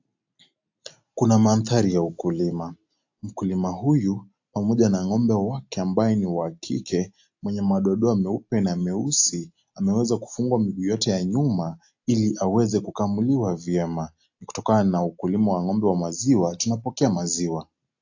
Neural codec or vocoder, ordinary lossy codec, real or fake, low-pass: none; AAC, 48 kbps; real; 7.2 kHz